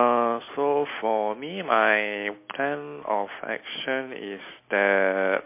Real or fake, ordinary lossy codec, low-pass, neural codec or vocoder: real; MP3, 24 kbps; 3.6 kHz; none